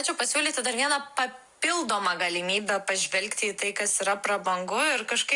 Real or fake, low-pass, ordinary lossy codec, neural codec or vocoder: real; 10.8 kHz; Opus, 64 kbps; none